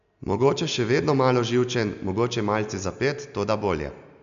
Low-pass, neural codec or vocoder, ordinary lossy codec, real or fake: 7.2 kHz; none; none; real